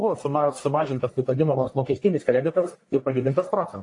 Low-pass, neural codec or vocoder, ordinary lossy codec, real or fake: 10.8 kHz; codec, 44.1 kHz, 1.7 kbps, Pupu-Codec; AAC, 48 kbps; fake